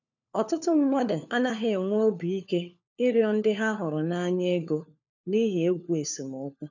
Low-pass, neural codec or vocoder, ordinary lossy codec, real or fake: 7.2 kHz; codec, 16 kHz, 4 kbps, FunCodec, trained on LibriTTS, 50 frames a second; none; fake